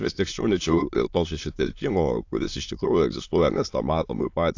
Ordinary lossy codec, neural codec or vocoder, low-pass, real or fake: AAC, 48 kbps; autoencoder, 22.05 kHz, a latent of 192 numbers a frame, VITS, trained on many speakers; 7.2 kHz; fake